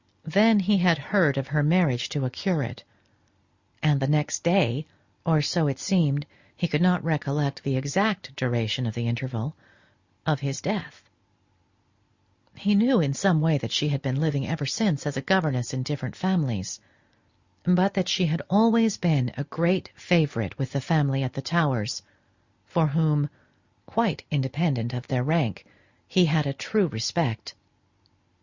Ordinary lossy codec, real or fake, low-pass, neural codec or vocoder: Opus, 64 kbps; real; 7.2 kHz; none